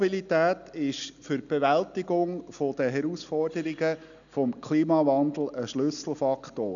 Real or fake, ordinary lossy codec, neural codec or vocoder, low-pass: real; none; none; 7.2 kHz